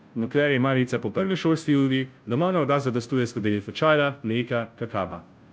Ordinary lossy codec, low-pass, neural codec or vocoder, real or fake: none; none; codec, 16 kHz, 0.5 kbps, FunCodec, trained on Chinese and English, 25 frames a second; fake